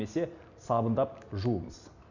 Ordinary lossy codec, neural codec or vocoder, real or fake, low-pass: none; none; real; 7.2 kHz